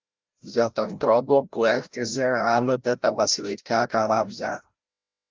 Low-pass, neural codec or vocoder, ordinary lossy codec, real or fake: 7.2 kHz; codec, 16 kHz, 0.5 kbps, FreqCodec, larger model; Opus, 24 kbps; fake